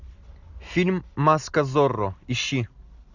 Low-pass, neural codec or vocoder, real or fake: 7.2 kHz; none; real